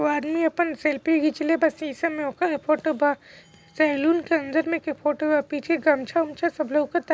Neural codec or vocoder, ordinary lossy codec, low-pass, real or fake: none; none; none; real